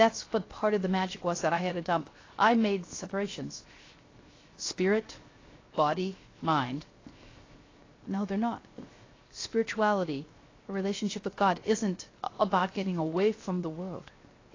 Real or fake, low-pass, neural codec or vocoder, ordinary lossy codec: fake; 7.2 kHz; codec, 16 kHz, 0.7 kbps, FocalCodec; AAC, 32 kbps